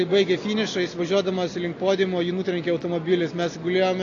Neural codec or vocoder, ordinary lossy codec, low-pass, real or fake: none; AAC, 32 kbps; 7.2 kHz; real